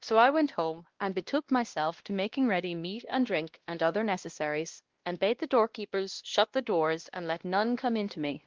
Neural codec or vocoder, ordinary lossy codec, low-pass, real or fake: codec, 16 kHz, 1 kbps, X-Codec, WavLM features, trained on Multilingual LibriSpeech; Opus, 16 kbps; 7.2 kHz; fake